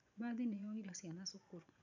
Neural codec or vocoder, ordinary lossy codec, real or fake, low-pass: vocoder, 24 kHz, 100 mel bands, Vocos; none; fake; 7.2 kHz